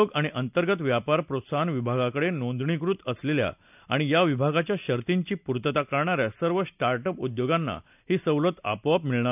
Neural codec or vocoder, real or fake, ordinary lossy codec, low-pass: none; real; none; 3.6 kHz